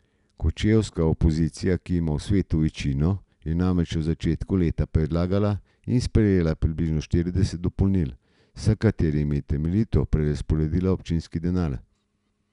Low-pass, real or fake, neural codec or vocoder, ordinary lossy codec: 10.8 kHz; real; none; none